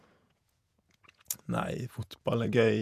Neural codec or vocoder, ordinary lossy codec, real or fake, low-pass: vocoder, 48 kHz, 128 mel bands, Vocos; none; fake; 14.4 kHz